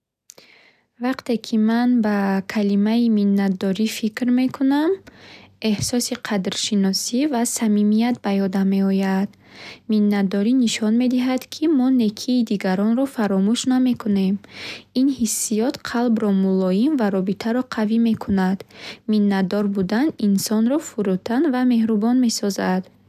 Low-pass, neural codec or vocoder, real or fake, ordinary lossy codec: 14.4 kHz; none; real; none